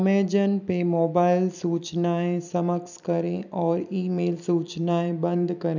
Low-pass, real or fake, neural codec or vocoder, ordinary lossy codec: 7.2 kHz; fake; vocoder, 44.1 kHz, 128 mel bands every 256 samples, BigVGAN v2; none